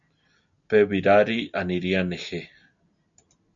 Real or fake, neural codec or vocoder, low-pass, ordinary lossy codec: real; none; 7.2 kHz; AAC, 64 kbps